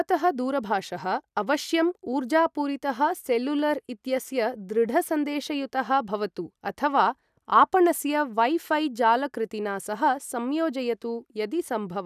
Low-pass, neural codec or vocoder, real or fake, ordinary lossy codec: 14.4 kHz; none; real; none